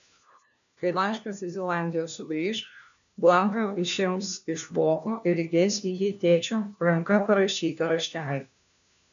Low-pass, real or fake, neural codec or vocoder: 7.2 kHz; fake; codec, 16 kHz, 1 kbps, FunCodec, trained on LibriTTS, 50 frames a second